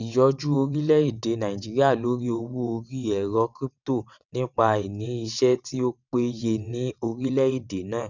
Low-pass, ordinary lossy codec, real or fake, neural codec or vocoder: 7.2 kHz; none; fake; vocoder, 22.05 kHz, 80 mel bands, WaveNeXt